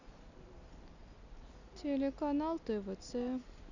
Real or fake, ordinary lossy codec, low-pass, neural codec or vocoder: real; none; 7.2 kHz; none